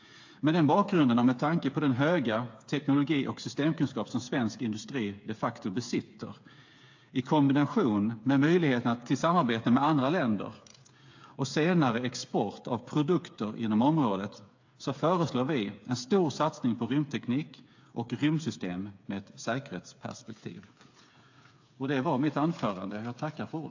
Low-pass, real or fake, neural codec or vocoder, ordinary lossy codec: 7.2 kHz; fake; codec, 16 kHz, 8 kbps, FreqCodec, smaller model; AAC, 48 kbps